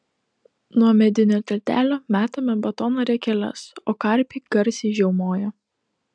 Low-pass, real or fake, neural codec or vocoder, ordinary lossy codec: 9.9 kHz; real; none; MP3, 96 kbps